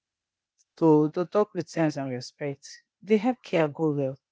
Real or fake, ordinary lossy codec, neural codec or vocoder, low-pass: fake; none; codec, 16 kHz, 0.8 kbps, ZipCodec; none